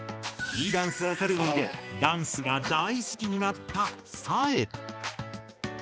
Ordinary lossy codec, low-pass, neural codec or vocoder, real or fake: none; none; codec, 16 kHz, 2 kbps, X-Codec, HuBERT features, trained on balanced general audio; fake